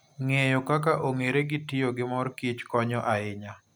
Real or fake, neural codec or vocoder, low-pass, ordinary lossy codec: real; none; none; none